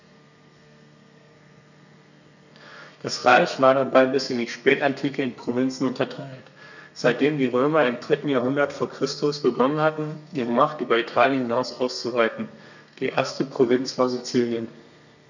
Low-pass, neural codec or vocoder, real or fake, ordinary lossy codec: 7.2 kHz; codec, 32 kHz, 1.9 kbps, SNAC; fake; none